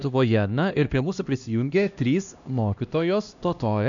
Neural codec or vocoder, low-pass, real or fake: codec, 16 kHz, 1 kbps, X-Codec, HuBERT features, trained on LibriSpeech; 7.2 kHz; fake